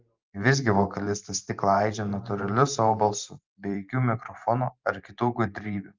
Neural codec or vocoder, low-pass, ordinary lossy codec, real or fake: vocoder, 44.1 kHz, 128 mel bands every 512 samples, BigVGAN v2; 7.2 kHz; Opus, 32 kbps; fake